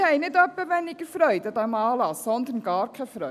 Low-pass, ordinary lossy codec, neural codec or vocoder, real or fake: 14.4 kHz; none; none; real